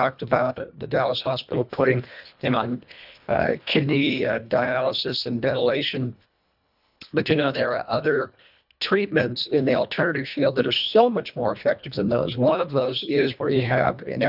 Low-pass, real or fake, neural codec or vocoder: 5.4 kHz; fake; codec, 24 kHz, 1.5 kbps, HILCodec